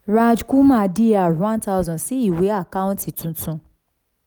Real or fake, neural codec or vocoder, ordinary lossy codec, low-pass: real; none; none; none